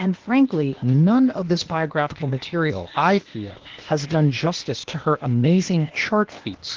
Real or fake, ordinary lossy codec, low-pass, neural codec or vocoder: fake; Opus, 16 kbps; 7.2 kHz; codec, 16 kHz, 0.8 kbps, ZipCodec